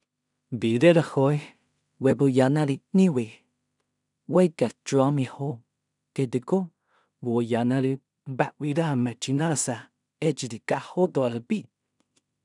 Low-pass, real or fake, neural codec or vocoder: 10.8 kHz; fake; codec, 16 kHz in and 24 kHz out, 0.4 kbps, LongCat-Audio-Codec, two codebook decoder